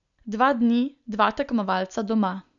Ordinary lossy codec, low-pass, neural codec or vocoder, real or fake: none; 7.2 kHz; none; real